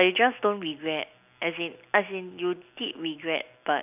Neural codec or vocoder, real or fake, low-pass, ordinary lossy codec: none; real; 3.6 kHz; none